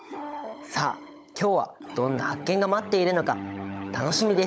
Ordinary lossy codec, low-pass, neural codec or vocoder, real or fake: none; none; codec, 16 kHz, 16 kbps, FunCodec, trained on LibriTTS, 50 frames a second; fake